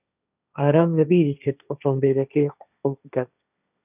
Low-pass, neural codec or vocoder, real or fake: 3.6 kHz; codec, 16 kHz, 1.1 kbps, Voila-Tokenizer; fake